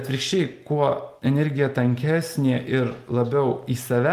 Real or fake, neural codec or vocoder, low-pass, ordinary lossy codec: real; none; 14.4 kHz; Opus, 32 kbps